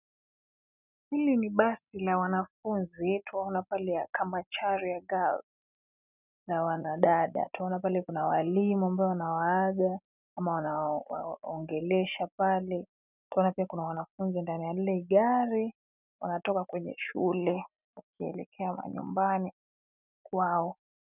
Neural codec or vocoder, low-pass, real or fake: none; 3.6 kHz; real